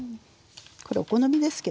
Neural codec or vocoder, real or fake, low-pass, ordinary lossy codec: none; real; none; none